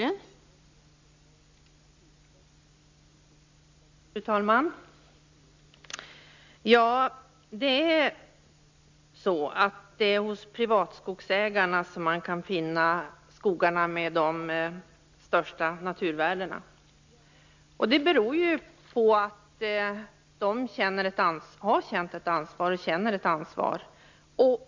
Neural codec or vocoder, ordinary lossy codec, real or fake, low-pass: none; MP3, 64 kbps; real; 7.2 kHz